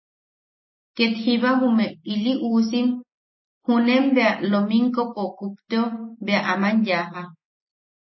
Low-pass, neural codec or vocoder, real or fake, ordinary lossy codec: 7.2 kHz; none; real; MP3, 24 kbps